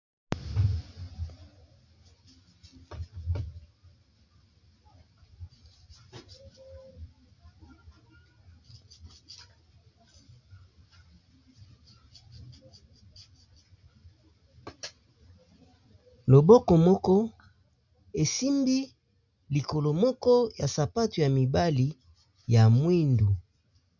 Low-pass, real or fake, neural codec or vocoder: 7.2 kHz; real; none